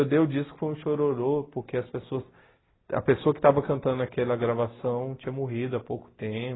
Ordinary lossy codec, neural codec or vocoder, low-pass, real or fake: AAC, 16 kbps; none; 7.2 kHz; real